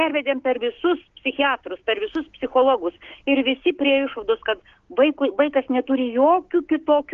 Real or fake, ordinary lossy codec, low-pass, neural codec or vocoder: fake; Opus, 16 kbps; 7.2 kHz; codec, 16 kHz, 6 kbps, DAC